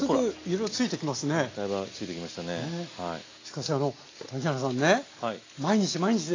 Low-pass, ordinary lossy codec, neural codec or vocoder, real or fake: 7.2 kHz; AAC, 32 kbps; none; real